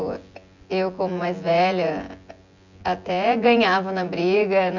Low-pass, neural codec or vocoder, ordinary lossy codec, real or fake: 7.2 kHz; vocoder, 24 kHz, 100 mel bands, Vocos; none; fake